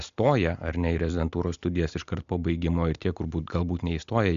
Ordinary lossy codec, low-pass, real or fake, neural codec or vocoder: MP3, 64 kbps; 7.2 kHz; real; none